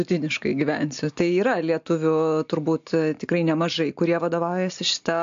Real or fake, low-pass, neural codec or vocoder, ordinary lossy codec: real; 7.2 kHz; none; AAC, 64 kbps